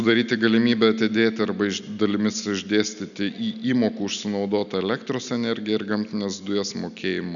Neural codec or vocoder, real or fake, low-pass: none; real; 7.2 kHz